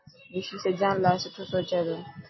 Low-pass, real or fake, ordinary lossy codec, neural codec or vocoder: 7.2 kHz; real; MP3, 24 kbps; none